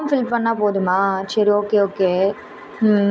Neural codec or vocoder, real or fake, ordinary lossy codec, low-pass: none; real; none; none